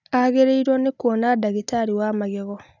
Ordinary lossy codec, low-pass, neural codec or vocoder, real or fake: none; 7.2 kHz; none; real